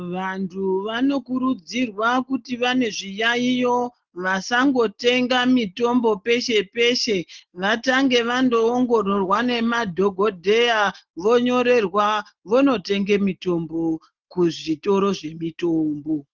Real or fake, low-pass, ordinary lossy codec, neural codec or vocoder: real; 7.2 kHz; Opus, 16 kbps; none